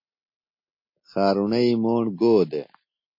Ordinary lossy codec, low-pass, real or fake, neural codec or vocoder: MP3, 32 kbps; 5.4 kHz; real; none